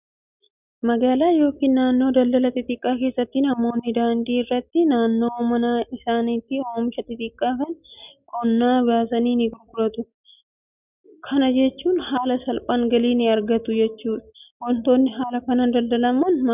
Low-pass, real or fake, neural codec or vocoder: 3.6 kHz; real; none